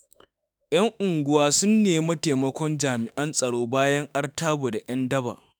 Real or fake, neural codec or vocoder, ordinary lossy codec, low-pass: fake; autoencoder, 48 kHz, 32 numbers a frame, DAC-VAE, trained on Japanese speech; none; none